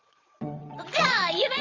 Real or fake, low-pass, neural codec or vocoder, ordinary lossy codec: fake; 7.2 kHz; vocoder, 22.05 kHz, 80 mel bands, WaveNeXt; Opus, 32 kbps